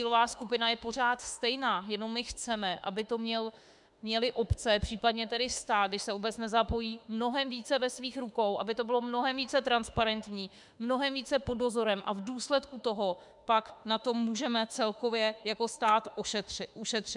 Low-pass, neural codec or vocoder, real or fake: 10.8 kHz; autoencoder, 48 kHz, 32 numbers a frame, DAC-VAE, trained on Japanese speech; fake